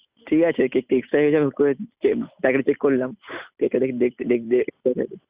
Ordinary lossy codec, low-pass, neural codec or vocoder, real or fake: Opus, 64 kbps; 3.6 kHz; none; real